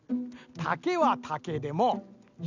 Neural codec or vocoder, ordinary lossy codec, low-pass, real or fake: none; none; 7.2 kHz; real